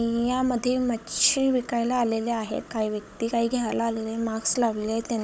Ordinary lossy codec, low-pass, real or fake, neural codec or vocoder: none; none; fake; codec, 16 kHz, 16 kbps, FunCodec, trained on Chinese and English, 50 frames a second